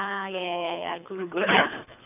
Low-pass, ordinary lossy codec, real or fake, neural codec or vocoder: 3.6 kHz; none; fake; codec, 24 kHz, 3 kbps, HILCodec